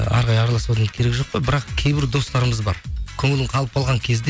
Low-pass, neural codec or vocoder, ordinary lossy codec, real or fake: none; none; none; real